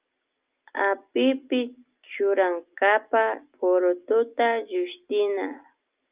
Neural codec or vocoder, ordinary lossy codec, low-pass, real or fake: none; Opus, 24 kbps; 3.6 kHz; real